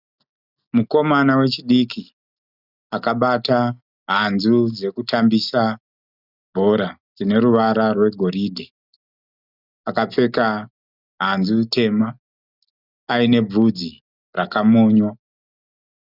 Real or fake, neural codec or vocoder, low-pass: real; none; 5.4 kHz